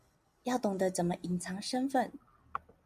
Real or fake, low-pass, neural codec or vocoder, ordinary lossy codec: real; 14.4 kHz; none; MP3, 96 kbps